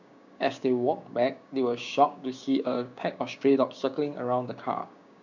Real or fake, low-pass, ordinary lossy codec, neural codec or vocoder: fake; 7.2 kHz; none; codec, 16 kHz, 6 kbps, DAC